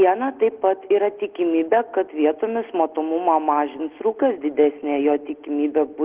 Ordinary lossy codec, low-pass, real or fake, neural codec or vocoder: Opus, 16 kbps; 3.6 kHz; real; none